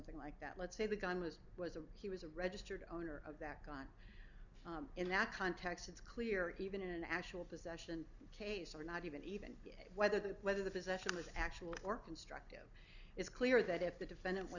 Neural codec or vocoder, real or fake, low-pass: none; real; 7.2 kHz